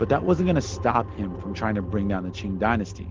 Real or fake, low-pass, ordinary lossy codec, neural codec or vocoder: real; 7.2 kHz; Opus, 16 kbps; none